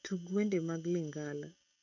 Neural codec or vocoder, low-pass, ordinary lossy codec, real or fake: autoencoder, 48 kHz, 128 numbers a frame, DAC-VAE, trained on Japanese speech; 7.2 kHz; none; fake